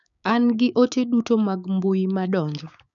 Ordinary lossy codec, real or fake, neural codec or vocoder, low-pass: none; fake; codec, 16 kHz, 6 kbps, DAC; 7.2 kHz